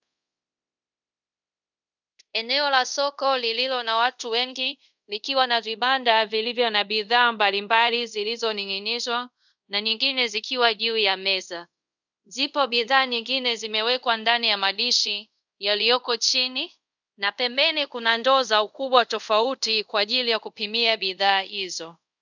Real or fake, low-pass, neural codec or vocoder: fake; 7.2 kHz; codec, 24 kHz, 0.5 kbps, DualCodec